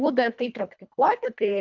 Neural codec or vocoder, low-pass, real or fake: codec, 24 kHz, 1.5 kbps, HILCodec; 7.2 kHz; fake